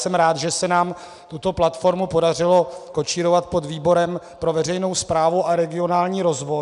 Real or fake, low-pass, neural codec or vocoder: real; 10.8 kHz; none